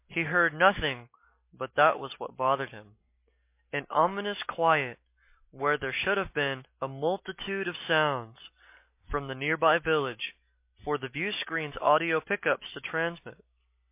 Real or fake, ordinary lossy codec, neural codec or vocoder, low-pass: real; MP3, 24 kbps; none; 3.6 kHz